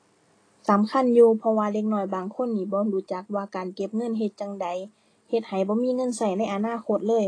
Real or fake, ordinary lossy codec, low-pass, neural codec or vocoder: real; AAC, 32 kbps; 9.9 kHz; none